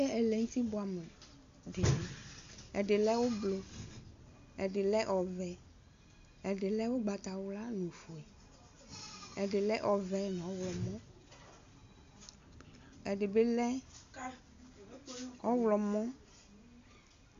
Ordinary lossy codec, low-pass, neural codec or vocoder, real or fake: AAC, 64 kbps; 7.2 kHz; none; real